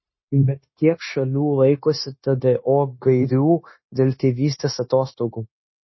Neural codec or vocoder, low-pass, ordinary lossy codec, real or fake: codec, 16 kHz, 0.9 kbps, LongCat-Audio-Codec; 7.2 kHz; MP3, 24 kbps; fake